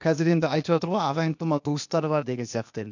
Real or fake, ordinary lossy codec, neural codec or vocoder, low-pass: fake; none; codec, 16 kHz, 0.8 kbps, ZipCodec; 7.2 kHz